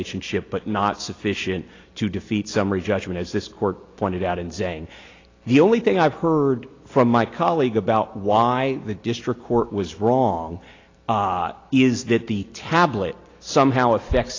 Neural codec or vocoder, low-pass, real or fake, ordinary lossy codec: none; 7.2 kHz; real; AAC, 32 kbps